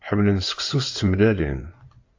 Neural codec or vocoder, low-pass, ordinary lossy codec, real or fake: codec, 16 kHz in and 24 kHz out, 2.2 kbps, FireRedTTS-2 codec; 7.2 kHz; AAC, 48 kbps; fake